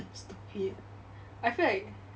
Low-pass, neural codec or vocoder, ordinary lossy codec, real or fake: none; none; none; real